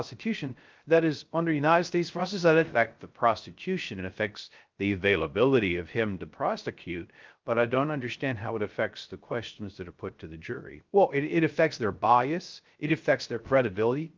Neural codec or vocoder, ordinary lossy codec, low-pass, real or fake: codec, 16 kHz, 0.3 kbps, FocalCodec; Opus, 24 kbps; 7.2 kHz; fake